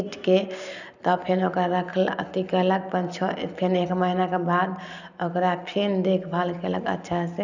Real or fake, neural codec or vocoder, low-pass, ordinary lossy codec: real; none; 7.2 kHz; none